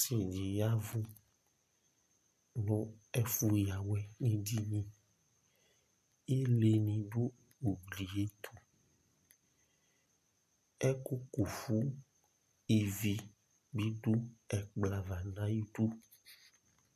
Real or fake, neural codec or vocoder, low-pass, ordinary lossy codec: real; none; 14.4 kHz; MP3, 64 kbps